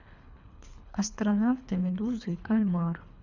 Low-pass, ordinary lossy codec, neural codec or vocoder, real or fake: 7.2 kHz; none; codec, 24 kHz, 3 kbps, HILCodec; fake